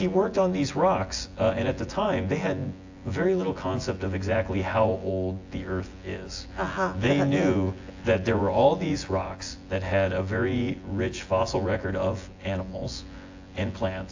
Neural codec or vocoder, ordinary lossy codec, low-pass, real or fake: vocoder, 24 kHz, 100 mel bands, Vocos; AAC, 48 kbps; 7.2 kHz; fake